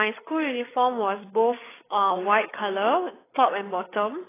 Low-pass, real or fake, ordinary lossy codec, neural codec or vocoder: 3.6 kHz; fake; AAC, 16 kbps; codec, 16 kHz, 8 kbps, FreqCodec, larger model